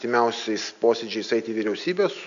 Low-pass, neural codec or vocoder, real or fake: 7.2 kHz; none; real